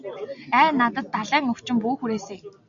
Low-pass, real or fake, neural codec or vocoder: 7.2 kHz; real; none